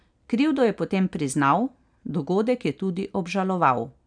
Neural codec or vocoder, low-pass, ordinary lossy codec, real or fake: none; 9.9 kHz; none; real